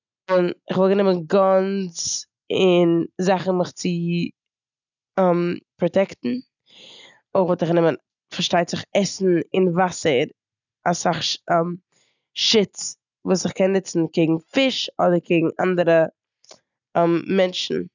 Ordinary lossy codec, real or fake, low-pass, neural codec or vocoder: none; real; 7.2 kHz; none